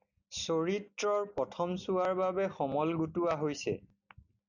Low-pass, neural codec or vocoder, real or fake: 7.2 kHz; none; real